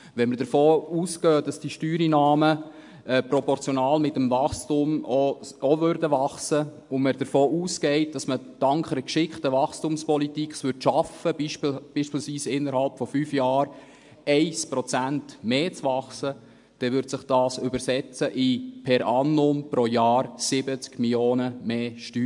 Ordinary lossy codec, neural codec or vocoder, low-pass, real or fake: MP3, 64 kbps; none; 10.8 kHz; real